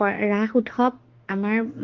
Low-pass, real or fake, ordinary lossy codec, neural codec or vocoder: 7.2 kHz; fake; Opus, 16 kbps; autoencoder, 48 kHz, 32 numbers a frame, DAC-VAE, trained on Japanese speech